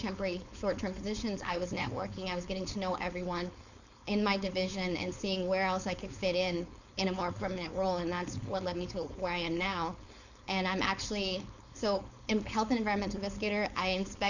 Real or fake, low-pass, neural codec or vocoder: fake; 7.2 kHz; codec, 16 kHz, 4.8 kbps, FACodec